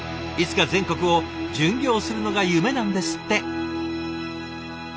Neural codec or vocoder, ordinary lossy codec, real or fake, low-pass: none; none; real; none